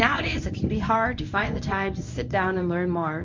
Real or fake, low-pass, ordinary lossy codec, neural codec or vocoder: fake; 7.2 kHz; MP3, 48 kbps; codec, 24 kHz, 0.9 kbps, WavTokenizer, medium speech release version 1